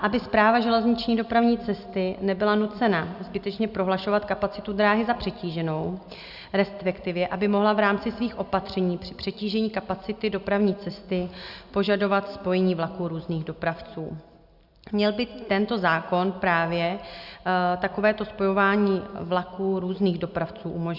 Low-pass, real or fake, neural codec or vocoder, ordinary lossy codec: 5.4 kHz; real; none; Opus, 64 kbps